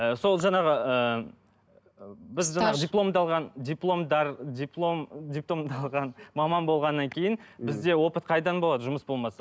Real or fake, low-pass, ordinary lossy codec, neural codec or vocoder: real; none; none; none